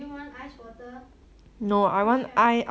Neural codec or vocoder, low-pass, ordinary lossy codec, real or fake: none; none; none; real